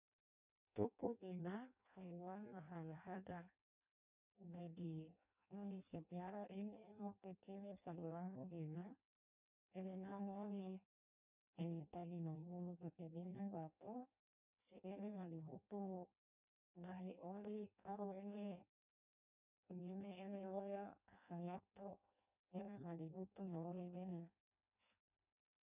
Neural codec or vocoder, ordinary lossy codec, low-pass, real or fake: codec, 16 kHz in and 24 kHz out, 0.6 kbps, FireRedTTS-2 codec; none; 3.6 kHz; fake